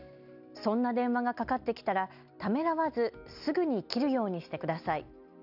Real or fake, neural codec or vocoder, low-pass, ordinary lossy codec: real; none; 5.4 kHz; none